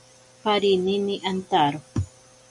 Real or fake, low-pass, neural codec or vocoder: real; 10.8 kHz; none